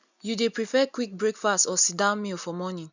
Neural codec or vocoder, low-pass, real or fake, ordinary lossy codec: none; 7.2 kHz; real; none